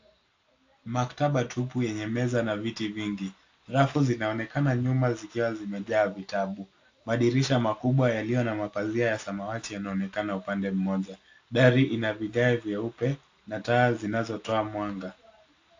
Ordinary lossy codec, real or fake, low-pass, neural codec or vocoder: AAC, 48 kbps; real; 7.2 kHz; none